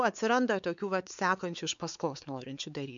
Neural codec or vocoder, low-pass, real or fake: codec, 16 kHz, 4 kbps, X-Codec, WavLM features, trained on Multilingual LibriSpeech; 7.2 kHz; fake